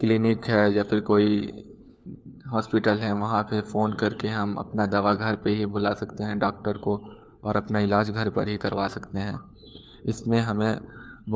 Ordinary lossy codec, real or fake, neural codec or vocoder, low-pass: none; fake; codec, 16 kHz, 4 kbps, FunCodec, trained on LibriTTS, 50 frames a second; none